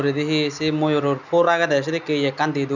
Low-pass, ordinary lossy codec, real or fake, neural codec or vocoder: 7.2 kHz; none; real; none